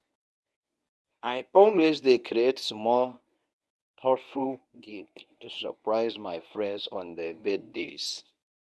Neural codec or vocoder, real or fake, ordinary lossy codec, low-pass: codec, 24 kHz, 0.9 kbps, WavTokenizer, medium speech release version 1; fake; none; none